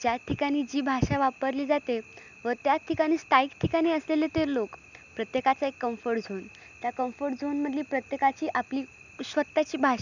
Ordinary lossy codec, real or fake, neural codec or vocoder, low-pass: none; real; none; 7.2 kHz